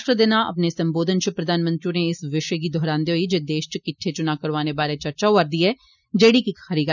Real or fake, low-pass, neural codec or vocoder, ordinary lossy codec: real; 7.2 kHz; none; none